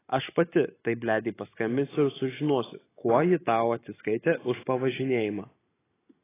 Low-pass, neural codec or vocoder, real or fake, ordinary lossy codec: 3.6 kHz; none; real; AAC, 16 kbps